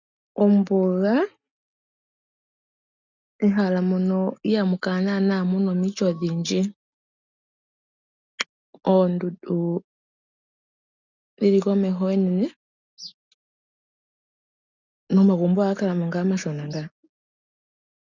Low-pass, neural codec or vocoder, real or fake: 7.2 kHz; none; real